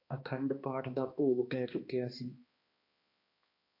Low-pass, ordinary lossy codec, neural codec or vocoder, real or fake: 5.4 kHz; AAC, 32 kbps; codec, 16 kHz, 2 kbps, X-Codec, HuBERT features, trained on balanced general audio; fake